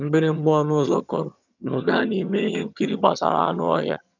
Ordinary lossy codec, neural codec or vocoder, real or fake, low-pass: none; vocoder, 22.05 kHz, 80 mel bands, HiFi-GAN; fake; 7.2 kHz